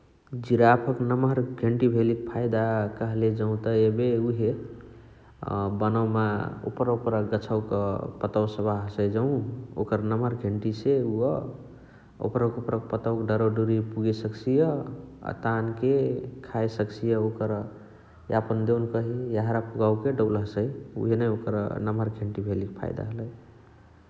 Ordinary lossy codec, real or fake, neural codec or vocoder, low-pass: none; real; none; none